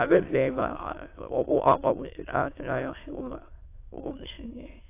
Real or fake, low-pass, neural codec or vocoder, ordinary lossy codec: fake; 3.6 kHz; autoencoder, 22.05 kHz, a latent of 192 numbers a frame, VITS, trained on many speakers; AAC, 24 kbps